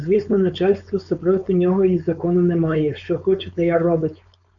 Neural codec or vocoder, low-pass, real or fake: codec, 16 kHz, 4.8 kbps, FACodec; 7.2 kHz; fake